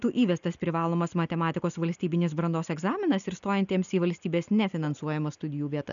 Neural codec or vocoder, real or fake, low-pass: none; real; 7.2 kHz